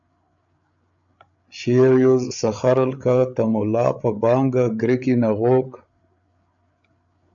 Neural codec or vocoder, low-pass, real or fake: codec, 16 kHz, 8 kbps, FreqCodec, larger model; 7.2 kHz; fake